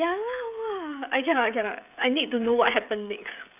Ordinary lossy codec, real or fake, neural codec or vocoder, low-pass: none; fake; codec, 16 kHz, 16 kbps, FreqCodec, smaller model; 3.6 kHz